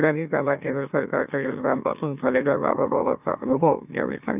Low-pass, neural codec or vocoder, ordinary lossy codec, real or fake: 3.6 kHz; autoencoder, 44.1 kHz, a latent of 192 numbers a frame, MeloTTS; none; fake